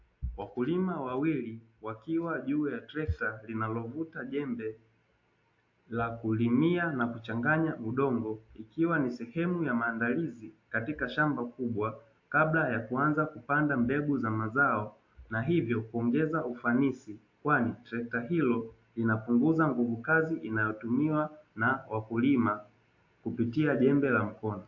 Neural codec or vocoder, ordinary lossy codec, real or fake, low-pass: none; AAC, 48 kbps; real; 7.2 kHz